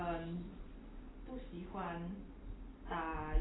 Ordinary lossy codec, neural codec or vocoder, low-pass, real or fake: AAC, 16 kbps; none; 7.2 kHz; real